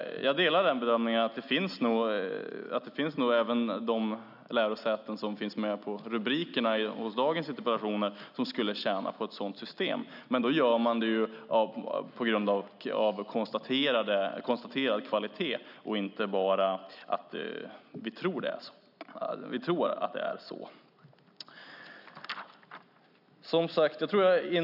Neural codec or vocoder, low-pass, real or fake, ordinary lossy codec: none; 5.4 kHz; real; none